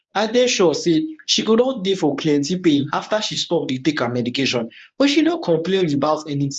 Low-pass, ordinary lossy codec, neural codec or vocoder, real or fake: 10.8 kHz; MP3, 96 kbps; codec, 24 kHz, 0.9 kbps, WavTokenizer, medium speech release version 1; fake